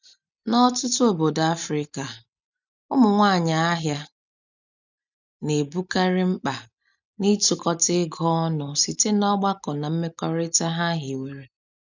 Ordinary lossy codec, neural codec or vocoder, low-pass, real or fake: none; none; 7.2 kHz; real